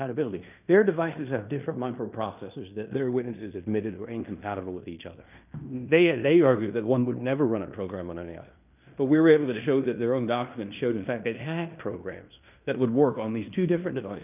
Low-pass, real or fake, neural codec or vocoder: 3.6 kHz; fake; codec, 16 kHz in and 24 kHz out, 0.9 kbps, LongCat-Audio-Codec, fine tuned four codebook decoder